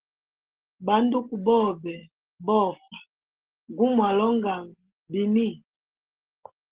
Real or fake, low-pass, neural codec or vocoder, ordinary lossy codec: real; 3.6 kHz; none; Opus, 16 kbps